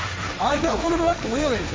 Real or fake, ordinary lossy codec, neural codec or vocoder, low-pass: fake; none; codec, 16 kHz, 1.1 kbps, Voila-Tokenizer; none